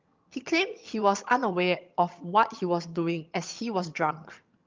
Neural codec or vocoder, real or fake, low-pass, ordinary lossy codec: vocoder, 22.05 kHz, 80 mel bands, HiFi-GAN; fake; 7.2 kHz; Opus, 24 kbps